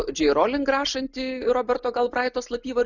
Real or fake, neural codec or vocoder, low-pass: real; none; 7.2 kHz